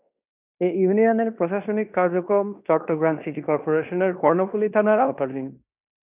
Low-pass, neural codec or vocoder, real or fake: 3.6 kHz; codec, 16 kHz in and 24 kHz out, 0.9 kbps, LongCat-Audio-Codec, fine tuned four codebook decoder; fake